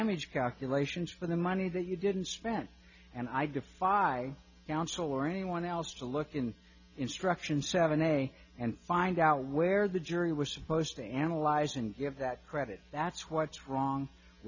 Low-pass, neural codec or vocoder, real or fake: 7.2 kHz; none; real